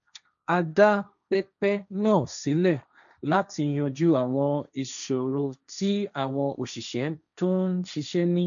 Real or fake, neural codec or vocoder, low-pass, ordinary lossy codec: fake; codec, 16 kHz, 1.1 kbps, Voila-Tokenizer; 7.2 kHz; none